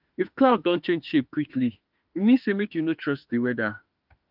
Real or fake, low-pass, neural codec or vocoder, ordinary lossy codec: fake; 5.4 kHz; autoencoder, 48 kHz, 32 numbers a frame, DAC-VAE, trained on Japanese speech; Opus, 24 kbps